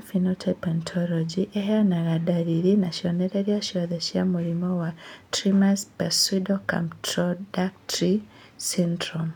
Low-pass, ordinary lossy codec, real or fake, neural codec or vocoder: 19.8 kHz; none; real; none